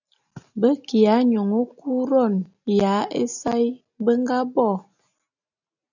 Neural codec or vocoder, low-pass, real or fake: none; 7.2 kHz; real